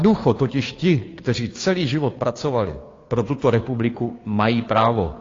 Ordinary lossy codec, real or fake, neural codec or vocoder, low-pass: AAC, 32 kbps; fake; codec, 16 kHz, 2 kbps, FunCodec, trained on Chinese and English, 25 frames a second; 7.2 kHz